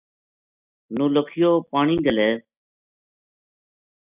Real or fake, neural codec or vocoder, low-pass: real; none; 3.6 kHz